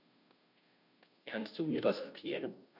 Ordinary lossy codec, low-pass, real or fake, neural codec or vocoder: none; 5.4 kHz; fake; codec, 16 kHz, 0.5 kbps, FunCodec, trained on Chinese and English, 25 frames a second